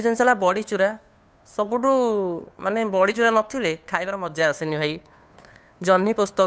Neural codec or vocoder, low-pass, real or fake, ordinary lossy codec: codec, 16 kHz, 2 kbps, FunCodec, trained on Chinese and English, 25 frames a second; none; fake; none